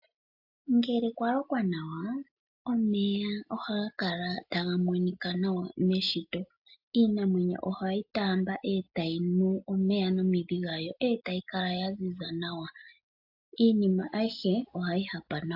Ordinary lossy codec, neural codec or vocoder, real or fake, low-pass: AAC, 48 kbps; none; real; 5.4 kHz